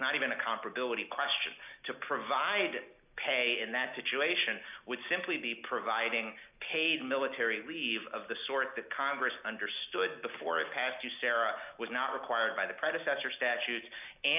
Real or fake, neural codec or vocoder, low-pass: real; none; 3.6 kHz